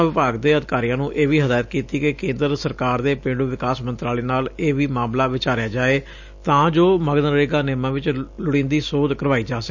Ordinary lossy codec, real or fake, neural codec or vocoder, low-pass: none; real; none; 7.2 kHz